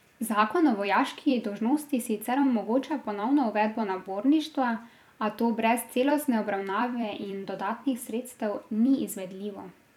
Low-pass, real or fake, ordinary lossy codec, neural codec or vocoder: 19.8 kHz; fake; none; vocoder, 44.1 kHz, 128 mel bands every 256 samples, BigVGAN v2